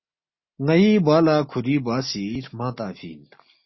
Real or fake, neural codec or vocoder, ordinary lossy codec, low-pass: real; none; MP3, 24 kbps; 7.2 kHz